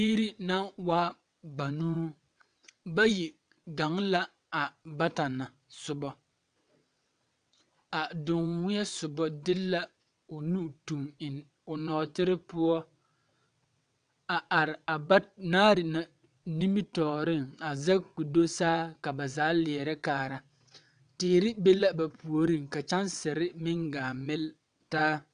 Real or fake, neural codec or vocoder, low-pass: fake; vocoder, 22.05 kHz, 80 mel bands, WaveNeXt; 9.9 kHz